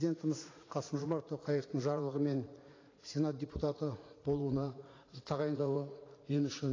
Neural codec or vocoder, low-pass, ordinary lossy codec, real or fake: vocoder, 44.1 kHz, 80 mel bands, Vocos; 7.2 kHz; AAC, 32 kbps; fake